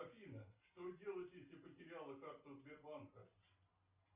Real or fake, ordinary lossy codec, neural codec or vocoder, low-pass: real; Opus, 64 kbps; none; 3.6 kHz